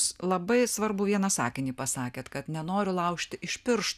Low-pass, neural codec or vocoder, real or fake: 14.4 kHz; none; real